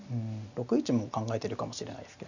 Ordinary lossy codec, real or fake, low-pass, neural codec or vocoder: none; real; 7.2 kHz; none